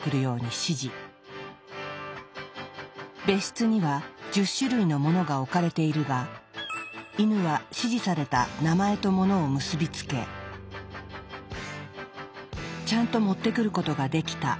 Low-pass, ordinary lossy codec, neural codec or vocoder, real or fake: none; none; none; real